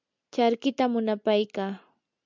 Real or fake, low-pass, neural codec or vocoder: real; 7.2 kHz; none